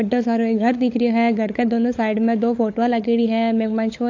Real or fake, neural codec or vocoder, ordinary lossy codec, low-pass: fake; codec, 16 kHz, 16 kbps, FunCodec, trained on LibriTTS, 50 frames a second; AAC, 48 kbps; 7.2 kHz